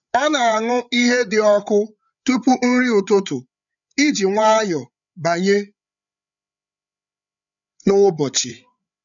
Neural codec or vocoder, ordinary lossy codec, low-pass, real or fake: codec, 16 kHz, 8 kbps, FreqCodec, larger model; none; 7.2 kHz; fake